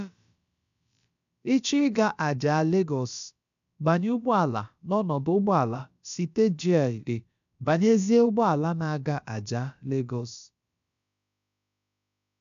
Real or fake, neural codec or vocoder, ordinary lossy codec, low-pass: fake; codec, 16 kHz, about 1 kbps, DyCAST, with the encoder's durations; none; 7.2 kHz